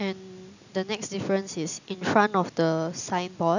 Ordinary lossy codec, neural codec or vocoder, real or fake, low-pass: none; none; real; 7.2 kHz